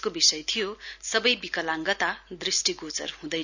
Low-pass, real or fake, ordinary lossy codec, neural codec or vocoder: 7.2 kHz; real; none; none